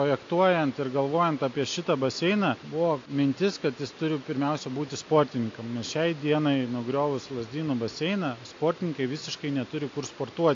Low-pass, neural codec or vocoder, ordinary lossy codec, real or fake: 7.2 kHz; none; AAC, 48 kbps; real